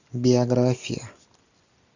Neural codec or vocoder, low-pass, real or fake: none; 7.2 kHz; real